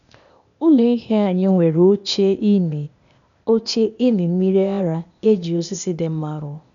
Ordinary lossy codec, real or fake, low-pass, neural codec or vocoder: none; fake; 7.2 kHz; codec, 16 kHz, 0.8 kbps, ZipCodec